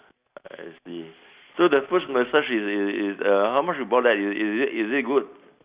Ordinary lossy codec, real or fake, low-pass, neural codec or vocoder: Opus, 64 kbps; real; 3.6 kHz; none